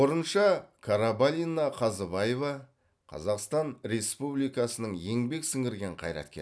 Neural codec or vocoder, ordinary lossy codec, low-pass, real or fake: none; none; none; real